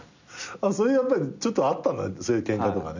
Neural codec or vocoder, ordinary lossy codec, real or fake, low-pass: none; none; real; 7.2 kHz